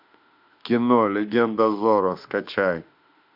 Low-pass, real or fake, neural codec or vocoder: 5.4 kHz; fake; autoencoder, 48 kHz, 32 numbers a frame, DAC-VAE, trained on Japanese speech